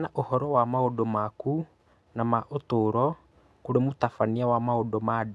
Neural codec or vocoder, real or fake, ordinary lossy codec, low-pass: none; real; none; none